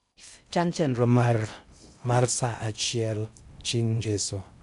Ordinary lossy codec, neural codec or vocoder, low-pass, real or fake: none; codec, 16 kHz in and 24 kHz out, 0.6 kbps, FocalCodec, streaming, 2048 codes; 10.8 kHz; fake